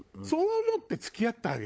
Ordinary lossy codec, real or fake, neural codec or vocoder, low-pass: none; fake; codec, 16 kHz, 16 kbps, FunCodec, trained on LibriTTS, 50 frames a second; none